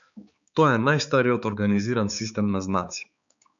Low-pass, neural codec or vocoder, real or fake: 7.2 kHz; codec, 16 kHz, 4 kbps, X-Codec, HuBERT features, trained on balanced general audio; fake